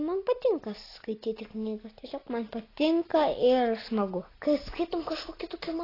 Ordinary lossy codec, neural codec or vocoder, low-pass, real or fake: AAC, 24 kbps; none; 5.4 kHz; real